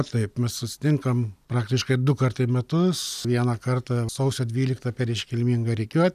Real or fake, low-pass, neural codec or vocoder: real; 14.4 kHz; none